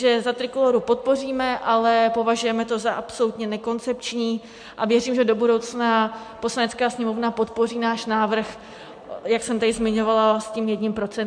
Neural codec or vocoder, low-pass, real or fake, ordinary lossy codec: none; 9.9 kHz; real; MP3, 64 kbps